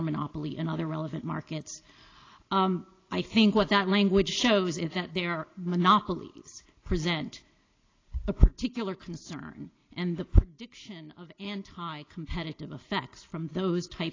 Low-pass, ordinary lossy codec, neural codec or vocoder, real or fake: 7.2 kHz; AAC, 32 kbps; none; real